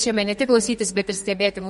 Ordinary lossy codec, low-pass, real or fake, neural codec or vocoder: MP3, 48 kbps; 14.4 kHz; fake; codec, 32 kHz, 1.9 kbps, SNAC